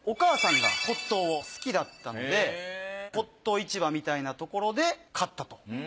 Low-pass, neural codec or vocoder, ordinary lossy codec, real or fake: none; none; none; real